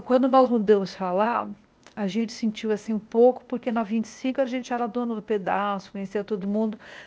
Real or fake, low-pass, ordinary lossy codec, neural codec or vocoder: fake; none; none; codec, 16 kHz, 0.8 kbps, ZipCodec